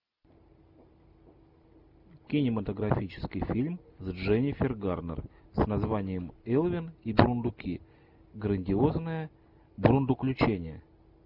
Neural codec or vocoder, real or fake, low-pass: none; real; 5.4 kHz